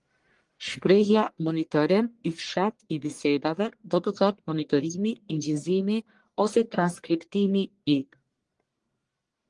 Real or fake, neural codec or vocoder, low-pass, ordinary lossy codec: fake; codec, 44.1 kHz, 1.7 kbps, Pupu-Codec; 10.8 kHz; Opus, 24 kbps